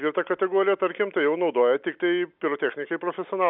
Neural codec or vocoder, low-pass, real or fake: none; 5.4 kHz; real